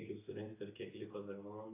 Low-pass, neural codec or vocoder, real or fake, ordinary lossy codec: 3.6 kHz; none; real; AAC, 24 kbps